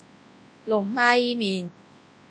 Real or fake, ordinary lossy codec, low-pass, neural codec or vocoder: fake; AAC, 64 kbps; 9.9 kHz; codec, 24 kHz, 0.9 kbps, WavTokenizer, large speech release